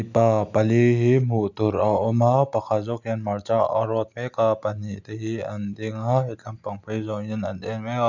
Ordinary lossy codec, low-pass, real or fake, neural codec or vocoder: none; 7.2 kHz; real; none